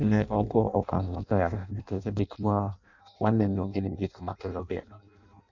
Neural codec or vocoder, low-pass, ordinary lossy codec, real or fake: codec, 16 kHz in and 24 kHz out, 0.6 kbps, FireRedTTS-2 codec; 7.2 kHz; none; fake